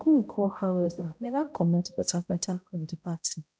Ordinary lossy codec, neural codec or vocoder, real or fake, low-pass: none; codec, 16 kHz, 0.5 kbps, X-Codec, HuBERT features, trained on balanced general audio; fake; none